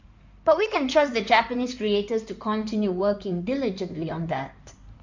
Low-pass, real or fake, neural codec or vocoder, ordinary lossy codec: 7.2 kHz; fake; codec, 16 kHz in and 24 kHz out, 2.2 kbps, FireRedTTS-2 codec; MP3, 64 kbps